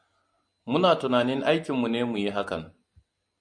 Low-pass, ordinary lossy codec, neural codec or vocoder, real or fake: 9.9 kHz; MP3, 64 kbps; none; real